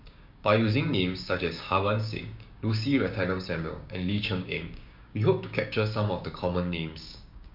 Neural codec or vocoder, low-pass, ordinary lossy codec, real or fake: codec, 16 kHz, 6 kbps, DAC; 5.4 kHz; MP3, 48 kbps; fake